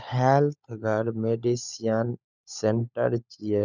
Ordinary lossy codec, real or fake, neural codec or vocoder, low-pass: none; fake; codec, 16 kHz, 16 kbps, FunCodec, trained on LibriTTS, 50 frames a second; 7.2 kHz